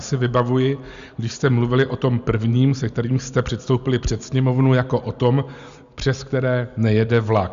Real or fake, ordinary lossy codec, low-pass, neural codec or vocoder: real; Opus, 64 kbps; 7.2 kHz; none